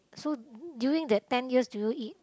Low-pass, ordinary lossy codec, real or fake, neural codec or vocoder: none; none; real; none